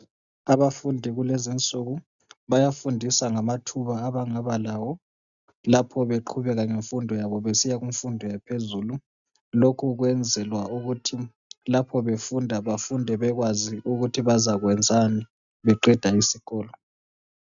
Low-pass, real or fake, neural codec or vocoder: 7.2 kHz; real; none